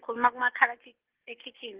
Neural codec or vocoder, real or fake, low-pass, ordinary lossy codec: none; real; 3.6 kHz; Opus, 16 kbps